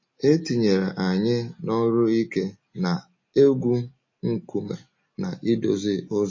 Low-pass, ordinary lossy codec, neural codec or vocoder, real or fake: 7.2 kHz; MP3, 32 kbps; none; real